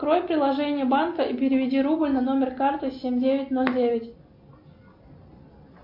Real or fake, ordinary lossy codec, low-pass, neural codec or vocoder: real; MP3, 48 kbps; 5.4 kHz; none